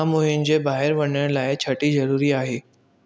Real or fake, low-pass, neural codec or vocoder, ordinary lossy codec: real; none; none; none